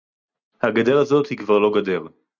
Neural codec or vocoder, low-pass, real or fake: none; 7.2 kHz; real